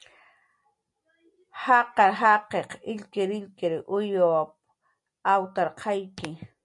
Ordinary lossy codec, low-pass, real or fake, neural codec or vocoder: MP3, 64 kbps; 10.8 kHz; real; none